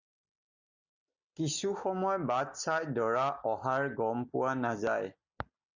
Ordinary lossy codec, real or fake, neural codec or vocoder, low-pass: Opus, 64 kbps; real; none; 7.2 kHz